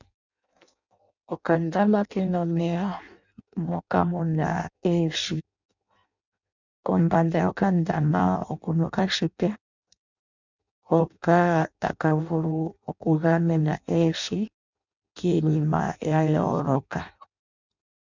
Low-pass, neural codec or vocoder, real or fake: 7.2 kHz; codec, 16 kHz in and 24 kHz out, 0.6 kbps, FireRedTTS-2 codec; fake